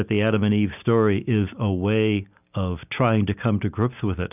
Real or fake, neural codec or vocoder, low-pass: real; none; 3.6 kHz